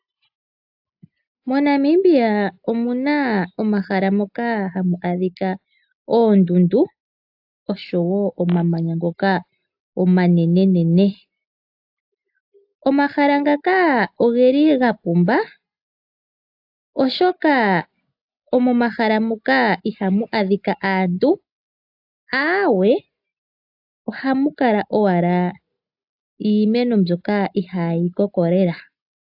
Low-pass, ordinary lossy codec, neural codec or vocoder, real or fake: 5.4 kHz; AAC, 48 kbps; none; real